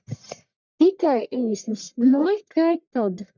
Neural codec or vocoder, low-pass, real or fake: codec, 44.1 kHz, 1.7 kbps, Pupu-Codec; 7.2 kHz; fake